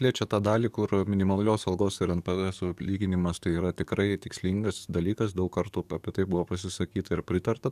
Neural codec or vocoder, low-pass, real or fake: codec, 44.1 kHz, 7.8 kbps, DAC; 14.4 kHz; fake